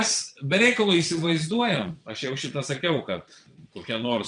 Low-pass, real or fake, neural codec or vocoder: 9.9 kHz; fake; vocoder, 22.05 kHz, 80 mel bands, Vocos